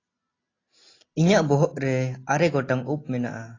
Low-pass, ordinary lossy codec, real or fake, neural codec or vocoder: 7.2 kHz; AAC, 32 kbps; real; none